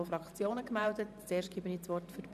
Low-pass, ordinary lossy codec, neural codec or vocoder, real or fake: 14.4 kHz; none; vocoder, 44.1 kHz, 128 mel bands every 512 samples, BigVGAN v2; fake